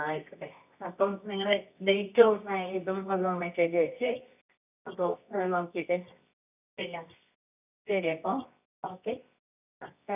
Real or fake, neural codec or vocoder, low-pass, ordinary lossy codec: fake; codec, 24 kHz, 0.9 kbps, WavTokenizer, medium music audio release; 3.6 kHz; none